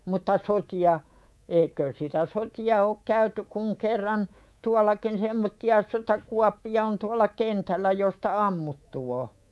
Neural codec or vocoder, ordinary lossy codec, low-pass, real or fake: codec, 24 kHz, 3.1 kbps, DualCodec; none; none; fake